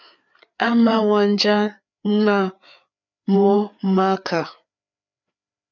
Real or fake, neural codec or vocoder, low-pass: fake; codec, 16 kHz, 4 kbps, FreqCodec, larger model; 7.2 kHz